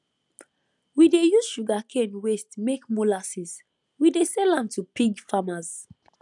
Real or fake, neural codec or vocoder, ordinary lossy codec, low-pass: real; none; none; 10.8 kHz